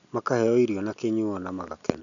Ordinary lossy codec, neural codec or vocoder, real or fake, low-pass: none; none; real; 7.2 kHz